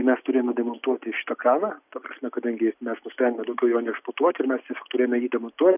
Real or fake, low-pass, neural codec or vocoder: real; 3.6 kHz; none